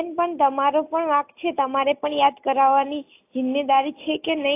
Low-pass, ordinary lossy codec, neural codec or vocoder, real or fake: 3.6 kHz; AAC, 24 kbps; none; real